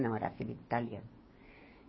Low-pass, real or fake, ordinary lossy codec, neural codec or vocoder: 7.2 kHz; fake; MP3, 24 kbps; codec, 16 kHz, 6 kbps, DAC